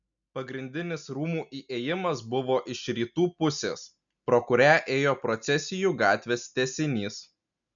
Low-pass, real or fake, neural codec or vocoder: 7.2 kHz; real; none